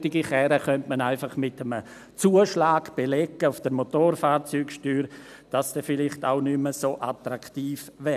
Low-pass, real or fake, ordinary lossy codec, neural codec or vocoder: 14.4 kHz; real; none; none